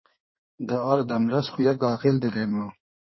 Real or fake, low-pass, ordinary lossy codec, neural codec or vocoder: fake; 7.2 kHz; MP3, 24 kbps; codec, 16 kHz in and 24 kHz out, 1.1 kbps, FireRedTTS-2 codec